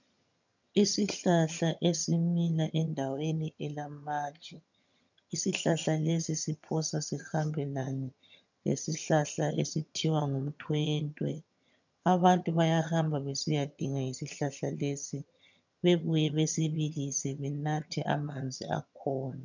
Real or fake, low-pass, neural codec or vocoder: fake; 7.2 kHz; vocoder, 22.05 kHz, 80 mel bands, HiFi-GAN